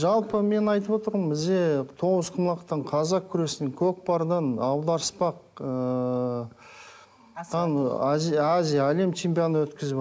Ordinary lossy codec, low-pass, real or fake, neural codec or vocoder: none; none; real; none